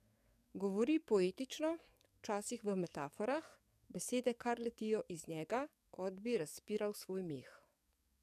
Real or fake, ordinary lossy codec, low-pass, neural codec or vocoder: fake; none; 14.4 kHz; codec, 44.1 kHz, 7.8 kbps, DAC